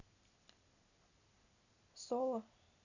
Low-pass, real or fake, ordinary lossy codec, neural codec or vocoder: 7.2 kHz; real; none; none